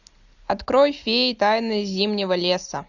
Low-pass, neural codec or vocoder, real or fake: 7.2 kHz; none; real